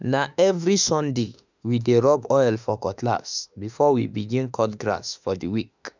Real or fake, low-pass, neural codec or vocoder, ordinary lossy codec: fake; 7.2 kHz; autoencoder, 48 kHz, 32 numbers a frame, DAC-VAE, trained on Japanese speech; none